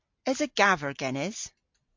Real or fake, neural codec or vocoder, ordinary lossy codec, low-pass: real; none; MP3, 48 kbps; 7.2 kHz